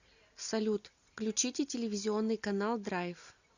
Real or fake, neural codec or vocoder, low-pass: real; none; 7.2 kHz